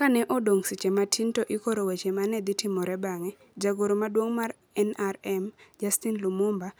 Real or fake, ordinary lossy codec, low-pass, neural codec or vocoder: real; none; none; none